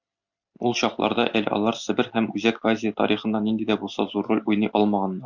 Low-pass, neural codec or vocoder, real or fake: 7.2 kHz; none; real